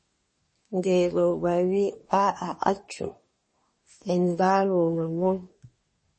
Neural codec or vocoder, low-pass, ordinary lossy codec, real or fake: codec, 24 kHz, 1 kbps, SNAC; 9.9 kHz; MP3, 32 kbps; fake